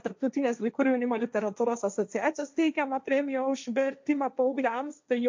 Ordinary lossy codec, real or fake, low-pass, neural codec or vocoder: MP3, 64 kbps; fake; 7.2 kHz; codec, 16 kHz, 1.1 kbps, Voila-Tokenizer